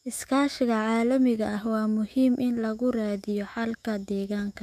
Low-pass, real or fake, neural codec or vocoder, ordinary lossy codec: 14.4 kHz; fake; autoencoder, 48 kHz, 128 numbers a frame, DAC-VAE, trained on Japanese speech; none